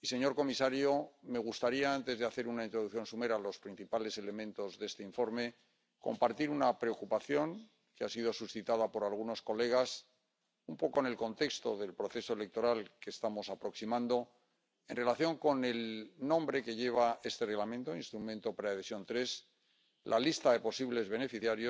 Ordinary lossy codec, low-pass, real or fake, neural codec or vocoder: none; none; real; none